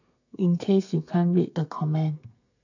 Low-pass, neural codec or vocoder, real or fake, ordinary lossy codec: 7.2 kHz; codec, 32 kHz, 1.9 kbps, SNAC; fake; none